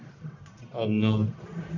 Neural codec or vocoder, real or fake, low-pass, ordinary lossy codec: codec, 44.1 kHz, 1.7 kbps, Pupu-Codec; fake; 7.2 kHz; AAC, 48 kbps